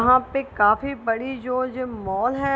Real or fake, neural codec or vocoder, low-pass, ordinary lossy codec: real; none; none; none